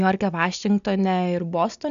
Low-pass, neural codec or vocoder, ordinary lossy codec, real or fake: 7.2 kHz; none; AAC, 96 kbps; real